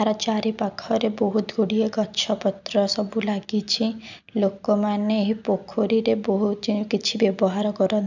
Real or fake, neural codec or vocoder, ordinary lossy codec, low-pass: real; none; none; 7.2 kHz